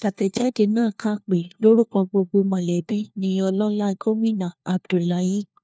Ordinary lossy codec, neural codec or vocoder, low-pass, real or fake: none; codec, 16 kHz, 1 kbps, FunCodec, trained on LibriTTS, 50 frames a second; none; fake